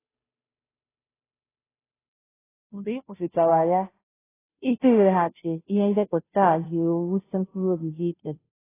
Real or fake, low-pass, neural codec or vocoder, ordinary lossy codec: fake; 3.6 kHz; codec, 16 kHz, 0.5 kbps, FunCodec, trained on Chinese and English, 25 frames a second; AAC, 16 kbps